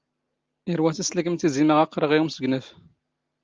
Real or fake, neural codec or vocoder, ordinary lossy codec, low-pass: real; none; Opus, 32 kbps; 7.2 kHz